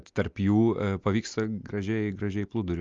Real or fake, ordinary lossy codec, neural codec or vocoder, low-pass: real; Opus, 32 kbps; none; 7.2 kHz